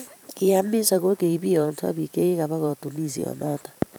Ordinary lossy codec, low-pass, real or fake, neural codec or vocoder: none; none; fake; vocoder, 44.1 kHz, 128 mel bands every 512 samples, BigVGAN v2